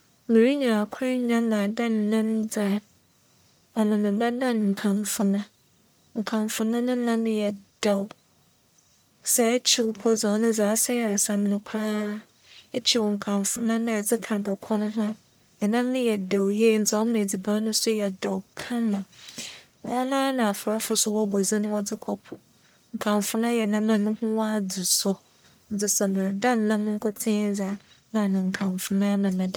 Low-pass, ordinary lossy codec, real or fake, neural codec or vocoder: none; none; fake; codec, 44.1 kHz, 1.7 kbps, Pupu-Codec